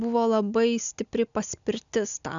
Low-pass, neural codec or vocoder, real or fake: 7.2 kHz; none; real